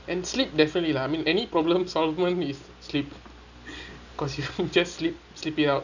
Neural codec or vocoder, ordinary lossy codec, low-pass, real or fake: none; none; 7.2 kHz; real